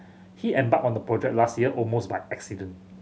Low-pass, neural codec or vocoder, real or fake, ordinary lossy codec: none; none; real; none